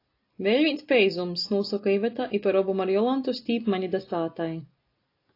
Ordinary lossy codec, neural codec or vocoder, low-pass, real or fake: AAC, 32 kbps; none; 5.4 kHz; real